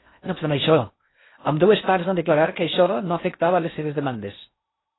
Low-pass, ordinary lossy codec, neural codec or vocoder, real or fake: 7.2 kHz; AAC, 16 kbps; codec, 16 kHz in and 24 kHz out, 0.6 kbps, FocalCodec, streaming, 4096 codes; fake